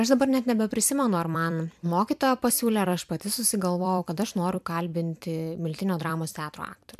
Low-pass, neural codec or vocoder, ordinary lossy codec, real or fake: 14.4 kHz; vocoder, 44.1 kHz, 128 mel bands every 256 samples, BigVGAN v2; MP3, 96 kbps; fake